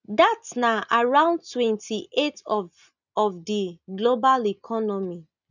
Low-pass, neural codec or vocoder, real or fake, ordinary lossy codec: 7.2 kHz; none; real; none